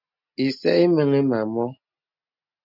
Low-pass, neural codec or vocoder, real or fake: 5.4 kHz; none; real